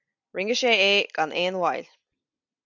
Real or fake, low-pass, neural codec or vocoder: real; 7.2 kHz; none